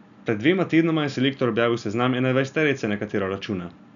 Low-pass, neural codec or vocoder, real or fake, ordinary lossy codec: 7.2 kHz; none; real; none